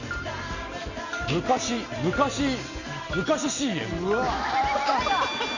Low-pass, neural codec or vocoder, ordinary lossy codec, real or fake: 7.2 kHz; none; none; real